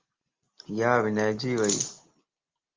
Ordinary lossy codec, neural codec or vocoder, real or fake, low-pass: Opus, 32 kbps; none; real; 7.2 kHz